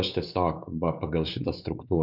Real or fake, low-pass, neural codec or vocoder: fake; 5.4 kHz; codec, 16 kHz, 4 kbps, X-Codec, WavLM features, trained on Multilingual LibriSpeech